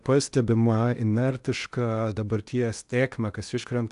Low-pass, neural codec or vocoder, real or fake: 10.8 kHz; codec, 16 kHz in and 24 kHz out, 0.8 kbps, FocalCodec, streaming, 65536 codes; fake